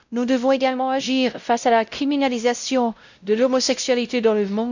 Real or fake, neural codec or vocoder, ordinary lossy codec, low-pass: fake; codec, 16 kHz, 0.5 kbps, X-Codec, WavLM features, trained on Multilingual LibriSpeech; none; 7.2 kHz